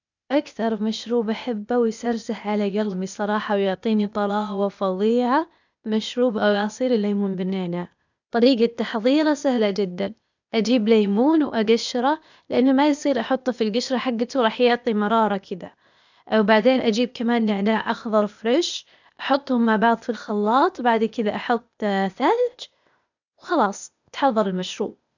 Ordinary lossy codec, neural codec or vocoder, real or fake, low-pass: none; codec, 16 kHz, 0.8 kbps, ZipCodec; fake; 7.2 kHz